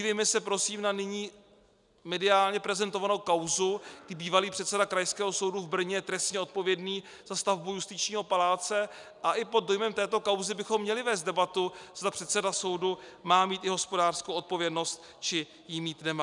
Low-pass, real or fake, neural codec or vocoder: 10.8 kHz; real; none